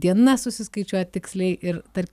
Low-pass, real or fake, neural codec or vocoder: 14.4 kHz; real; none